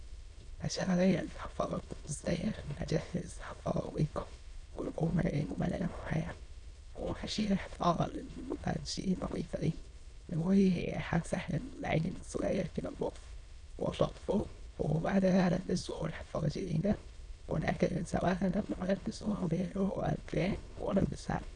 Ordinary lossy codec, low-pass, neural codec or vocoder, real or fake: none; 9.9 kHz; autoencoder, 22.05 kHz, a latent of 192 numbers a frame, VITS, trained on many speakers; fake